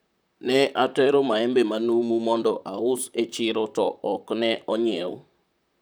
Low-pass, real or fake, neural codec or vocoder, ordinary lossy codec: none; fake; vocoder, 44.1 kHz, 128 mel bands, Pupu-Vocoder; none